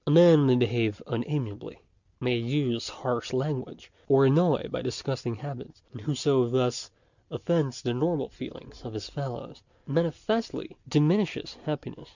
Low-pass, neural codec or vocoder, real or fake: 7.2 kHz; none; real